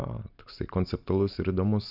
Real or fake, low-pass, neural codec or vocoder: real; 5.4 kHz; none